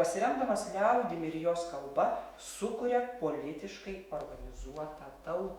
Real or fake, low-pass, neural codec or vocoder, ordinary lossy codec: fake; 19.8 kHz; autoencoder, 48 kHz, 128 numbers a frame, DAC-VAE, trained on Japanese speech; Opus, 64 kbps